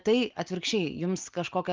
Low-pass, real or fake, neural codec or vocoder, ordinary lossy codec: 7.2 kHz; real; none; Opus, 32 kbps